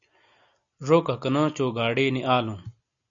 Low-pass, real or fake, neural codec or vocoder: 7.2 kHz; real; none